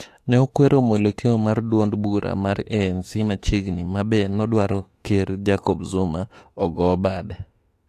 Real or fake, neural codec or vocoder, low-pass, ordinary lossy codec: fake; autoencoder, 48 kHz, 32 numbers a frame, DAC-VAE, trained on Japanese speech; 14.4 kHz; AAC, 48 kbps